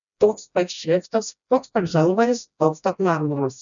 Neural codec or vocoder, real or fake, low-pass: codec, 16 kHz, 1 kbps, FreqCodec, smaller model; fake; 7.2 kHz